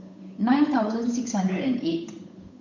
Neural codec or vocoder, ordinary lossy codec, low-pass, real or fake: codec, 16 kHz, 8 kbps, FunCodec, trained on Chinese and English, 25 frames a second; MP3, 48 kbps; 7.2 kHz; fake